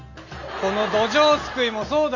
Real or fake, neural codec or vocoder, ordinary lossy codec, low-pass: real; none; none; 7.2 kHz